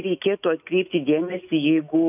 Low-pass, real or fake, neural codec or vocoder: 3.6 kHz; fake; autoencoder, 48 kHz, 128 numbers a frame, DAC-VAE, trained on Japanese speech